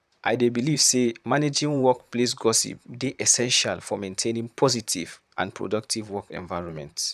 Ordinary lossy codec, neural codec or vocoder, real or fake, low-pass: none; vocoder, 44.1 kHz, 128 mel bands, Pupu-Vocoder; fake; 14.4 kHz